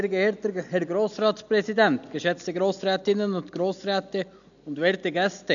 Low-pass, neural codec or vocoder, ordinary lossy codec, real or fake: 7.2 kHz; none; none; real